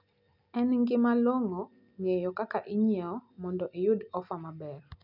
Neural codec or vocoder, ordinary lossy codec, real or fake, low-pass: none; none; real; 5.4 kHz